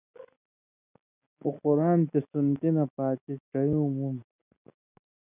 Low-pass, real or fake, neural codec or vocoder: 3.6 kHz; real; none